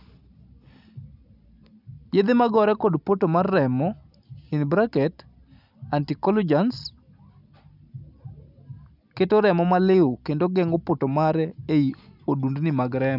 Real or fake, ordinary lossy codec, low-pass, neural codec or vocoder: real; none; 5.4 kHz; none